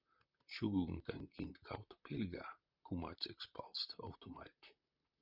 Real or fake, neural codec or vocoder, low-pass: fake; vocoder, 44.1 kHz, 128 mel bands, Pupu-Vocoder; 5.4 kHz